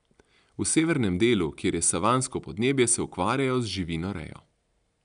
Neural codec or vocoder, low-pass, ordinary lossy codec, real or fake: none; 9.9 kHz; none; real